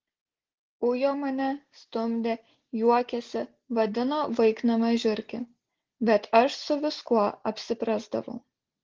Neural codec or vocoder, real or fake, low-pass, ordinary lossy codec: none; real; 7.2 kHz; Opus, 16 kbps